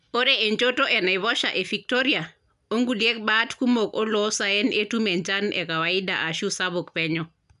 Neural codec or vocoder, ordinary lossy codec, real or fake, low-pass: none; none; real; 10.8 kHz